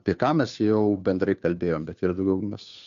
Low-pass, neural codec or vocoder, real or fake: 7.2 kHz; codec, 16 kHz, 2 kbps, FunCodec, trained on Chinese and English, 25 frames a second; fake